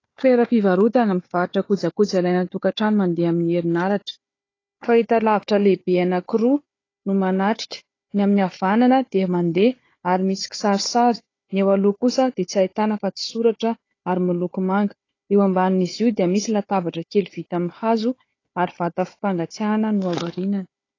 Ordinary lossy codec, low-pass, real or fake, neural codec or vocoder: AAC, 32 kbps; 7.2 kHz; fake; codec, 16 kHz, 4 kbps, FunCodec, trained on Chinese and English, 50 frames a second